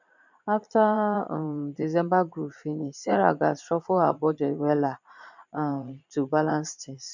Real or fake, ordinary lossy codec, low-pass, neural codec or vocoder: fake; none; 7.2 kHz; vocoder, 22.05 kHz, 80 mel bands, Vocos